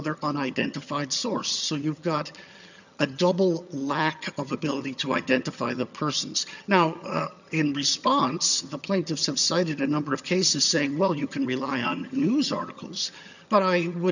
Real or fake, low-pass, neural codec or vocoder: fake; 7.2 kHz; vocoder, 22.05 kHz, 80 mel bands, HiFi-GAN